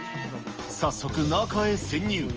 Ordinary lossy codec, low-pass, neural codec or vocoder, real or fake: Opus, 24 kbps; 7.2 kHz; none; real